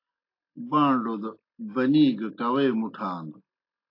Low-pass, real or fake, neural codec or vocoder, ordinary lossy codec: 5.4 kHz; real; none; AAC, 32 kbps